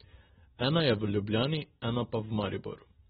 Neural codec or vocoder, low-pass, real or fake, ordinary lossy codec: none; 19.8 kHz; real; AAC, 16 kbps